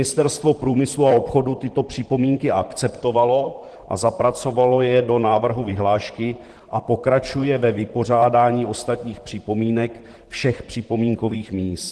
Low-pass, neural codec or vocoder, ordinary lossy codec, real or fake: 10.8 kHz; vocoder, 44.1 kHz, 128 mel bands, Pupu-Vocoder; Opus, 16 kbps; fake